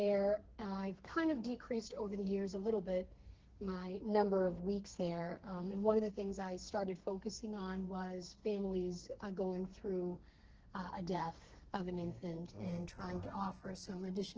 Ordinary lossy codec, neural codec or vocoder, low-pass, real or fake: Opus, 16 kbps; codec, 32 kHz, 1.9 kbps, SNAC; 7.2 kHz; fake